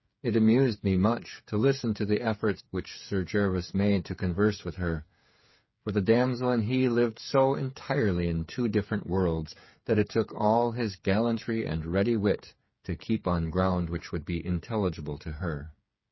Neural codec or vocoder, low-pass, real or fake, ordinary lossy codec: codec, 16 kHz, 8 kbps, FreqCodec, smaller model; 7.2 kHz; fake; MP3, 24 kbps